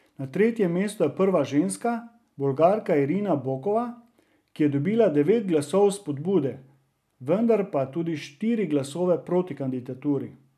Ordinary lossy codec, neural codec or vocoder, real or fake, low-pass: none; none; real; 14.4 kHz